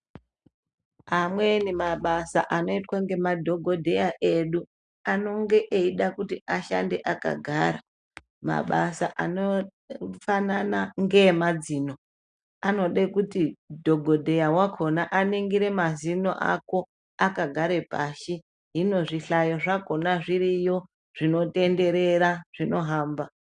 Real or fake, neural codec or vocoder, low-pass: real; none; 9.9 kHz